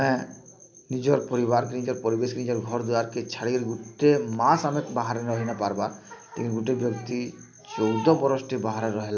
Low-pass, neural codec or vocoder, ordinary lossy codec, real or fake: none; none; none; real